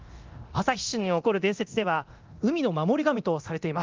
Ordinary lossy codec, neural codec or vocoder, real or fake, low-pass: Opus, 32 kbps; codec, 24 kHz, 0.9 kbps, DualCodec; fake; 7.2 kHz